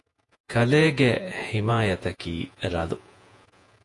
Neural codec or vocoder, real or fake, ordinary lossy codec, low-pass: vocoder, 48 kHz, 128 mel bands, Vocos; fake; AAC, 48 kbps; 10.8 kHz